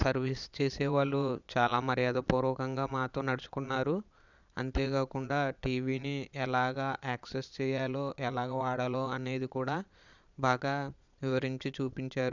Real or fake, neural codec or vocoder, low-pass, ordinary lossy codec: fake; vocoder, 22.05 kHz, 80 mel bands, Vocos; 7.2 kHz; none